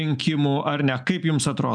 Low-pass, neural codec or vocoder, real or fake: 9.9 kHz; none; real